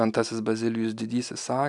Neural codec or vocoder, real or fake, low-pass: none; real; 10.8 kHz